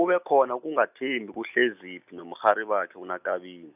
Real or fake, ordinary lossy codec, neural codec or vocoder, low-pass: real; none; none; 3.6 kHz